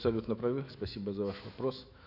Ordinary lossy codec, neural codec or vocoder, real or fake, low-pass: none; autoencoder, 48 kHz, 128 numbers a frame, DAC-VAE, trained on Japanese speech; fake; 5.4 kHz